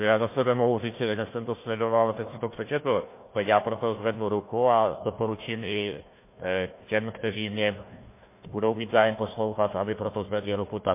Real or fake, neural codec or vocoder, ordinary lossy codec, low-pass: fake; codec, 16 kHz, 1 kbps, FunCodec, trained on Chinese and English, 50 frames a second; MP3, 24 kbps; 3.6 kHz